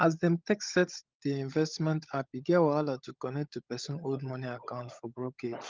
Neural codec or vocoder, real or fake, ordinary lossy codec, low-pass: codec, 16 kHz, 16 kbps, FunCodec, trained on LibriTTS, 50 frames a second; fake; Opus, 32 kbps; 7.2 kHz